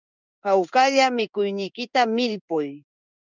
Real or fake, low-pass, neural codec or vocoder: fake; 7.2 kHz; codec, 16 kHz in and 24 kHz out, 1 kbps, XY-Tokenizer